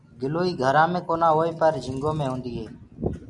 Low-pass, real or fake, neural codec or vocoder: 10.8 kHz; real; none